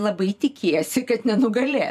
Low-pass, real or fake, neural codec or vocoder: 14.4 kHz; fake; vocoder, 44.1 kHz, 128 mel bands every 512 samples, BigVGAN v2